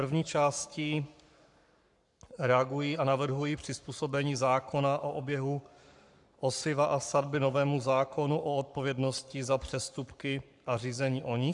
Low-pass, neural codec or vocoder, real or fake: 10.8 kHz; codec, 44.1 kHz, 7.8 kbps, Pupu-Codec; fake